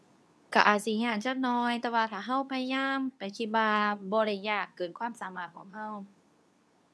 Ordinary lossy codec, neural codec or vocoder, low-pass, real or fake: none; codec, 24 kHz, 0.9 kbps, WavTokenizer, medium speech release version 2; none; fake